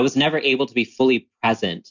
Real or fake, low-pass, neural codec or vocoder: real; 7.2 kHz; none